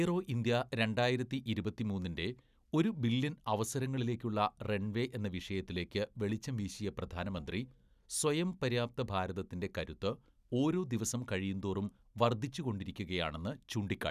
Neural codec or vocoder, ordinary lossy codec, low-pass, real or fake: none; none; 14.4 kHz; real